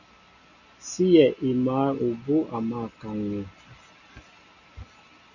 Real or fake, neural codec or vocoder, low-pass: real; none; 7.2 kHz